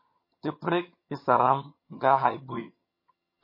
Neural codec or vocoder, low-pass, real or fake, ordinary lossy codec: vocoder, 22.05 kHz, 80 mel bands, HiFi-GAN; 5.4 kHz; fake; MP3, 24 kbps